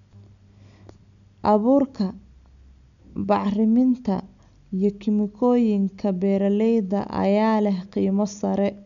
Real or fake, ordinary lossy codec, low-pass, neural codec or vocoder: real; none; 7.2 kHz; none